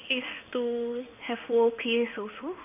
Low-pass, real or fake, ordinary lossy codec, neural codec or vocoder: 3.6 kHz; fake; none; codec, 16 kHz in and 24 kHz out, 1 kbps, XY-Tokenizer